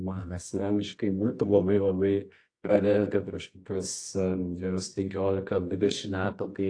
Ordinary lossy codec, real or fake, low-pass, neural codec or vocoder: AAC, 48 kbps; fake; 9.9 kHz; codec, 24 kHz, 0.9 kbps, WavTokenizer, medium music audio release